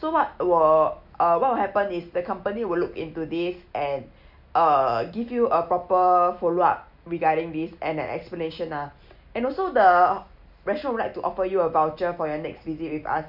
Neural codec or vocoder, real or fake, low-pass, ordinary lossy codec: none; real; 5.4 kHz; none